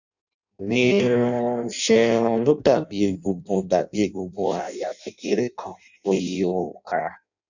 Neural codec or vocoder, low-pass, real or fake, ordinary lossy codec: codec, 16 kHz in and 24 kHz out, 0.6 kbps, FireRedTTS-2 codec; 7.2 kHz; fake; none